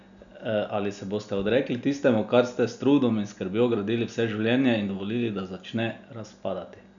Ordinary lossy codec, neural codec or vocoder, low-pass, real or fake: none; none; 7.2 kHz; real